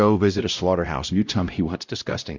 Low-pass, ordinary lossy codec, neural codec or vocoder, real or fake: 7.2 kHz; Opus, 64 kbps; codec, 16 kHz, 0.5 kbps, X-Codec, WavLM features, trained on Multilingual LibriSpeech; fake